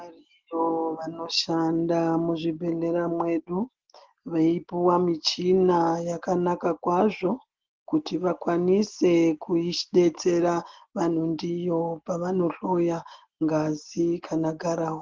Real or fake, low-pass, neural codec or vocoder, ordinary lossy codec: real; 7.2 kHz; none; Opus, 16 kbps